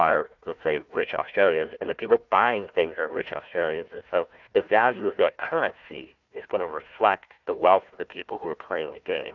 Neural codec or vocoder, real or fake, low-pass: codec, 16 kHz, 1 kbps, FunCodec, trained on Chinese and English, 50 frames a second; fake; 7.2 kHz